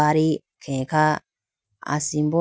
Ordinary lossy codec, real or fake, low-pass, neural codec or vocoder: none; real; none; none